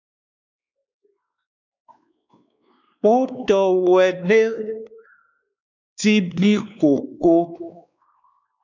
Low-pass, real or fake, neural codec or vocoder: 7.2 kHz; fake; codec, 16 kHz, 2 kbps, X-Codec, WavLM features, trained on Multilingual LibriSpeech